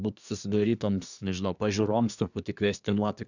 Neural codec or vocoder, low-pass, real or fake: codec, 24 kHz, 1 kbps, SNAC; 7.2 kHz; fake